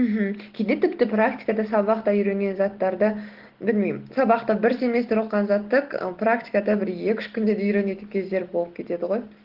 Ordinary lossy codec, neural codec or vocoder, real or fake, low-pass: Opus, 16 kbps; none; real; 5.4 kHz